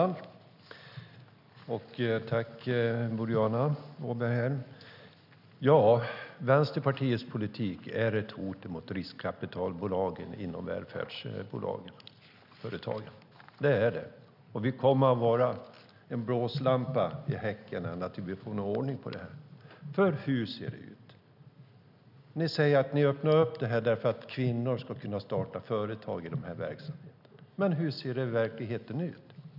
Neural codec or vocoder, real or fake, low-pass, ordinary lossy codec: none; real; 5.4 kHz; none